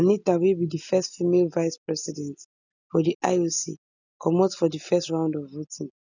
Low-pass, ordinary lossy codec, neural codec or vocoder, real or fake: 7.2 kHz; none; none; real